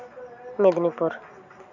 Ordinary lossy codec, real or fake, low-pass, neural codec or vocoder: none; real; 7.2 kHz; none